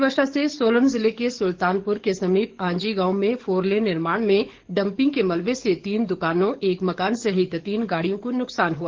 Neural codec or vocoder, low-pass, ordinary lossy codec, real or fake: codec, 44.1 kHz, 7.8 kbps, DAC; 7.2 kHz; Opus, 16 kbps; fake